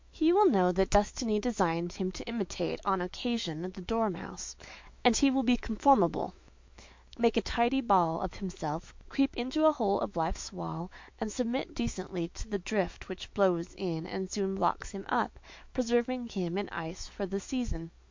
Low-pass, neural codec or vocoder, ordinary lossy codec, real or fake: 7.2 kHz; autoencoder, 48 kHz, 128 numbers a frame, DAC-VAE, trained on Japanese speech; MP3, 48 kbps; fake